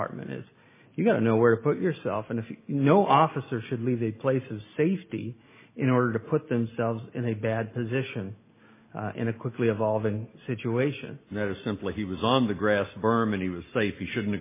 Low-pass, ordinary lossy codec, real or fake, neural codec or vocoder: 3.6 kHz; MP3, 16 kbps; real; none